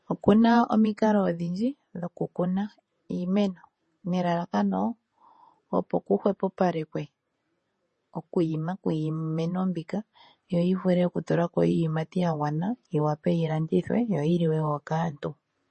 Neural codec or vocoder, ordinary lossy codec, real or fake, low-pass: vocoder, 48 kHz, 128 mel bands, Vocos; MP3, 32 kbps; fake; 10.8 kHz